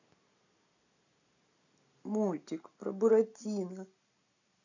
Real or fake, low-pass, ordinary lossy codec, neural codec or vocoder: real; 7.2 kHz; none; none